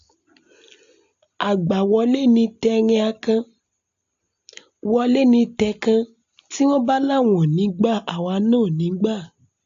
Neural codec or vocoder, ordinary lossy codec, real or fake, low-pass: none; AAC, 64 kbps; real; 7.2 kHz